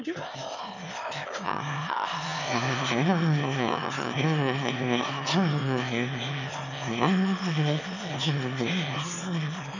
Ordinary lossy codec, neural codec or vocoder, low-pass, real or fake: none; autoencoder, 22.05 kHz, a latent of 192 numbers a frame, VITS, trained on one speaker; 7.2 kHz; fake